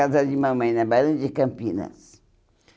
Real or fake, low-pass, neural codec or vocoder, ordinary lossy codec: real; none; none; none